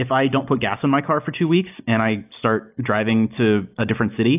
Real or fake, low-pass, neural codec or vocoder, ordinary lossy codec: real; 3.6 kHz; none; AAC, 32 kbps